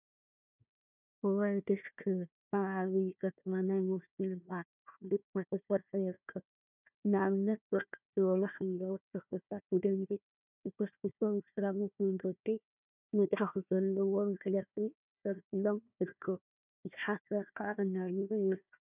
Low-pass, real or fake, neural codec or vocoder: 3.6 kHz; fake; codec, 16 kHz, 1 kbps, FunCodec, trained on Chinese and English, 50 frames a second